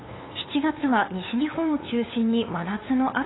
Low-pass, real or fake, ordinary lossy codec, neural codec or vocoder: 7.2 kHz; fake; AAC, 16 kbps; codec, 16 kHz, 8 kbps, FunCodec, trained on LibriTTS, 25 frames a second